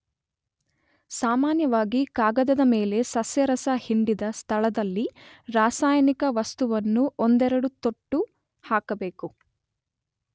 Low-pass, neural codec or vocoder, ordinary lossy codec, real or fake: none; none; none; real